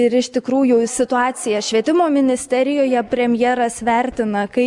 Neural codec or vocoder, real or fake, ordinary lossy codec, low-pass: none; real; Opus, 64 kbps; 10.8 kHz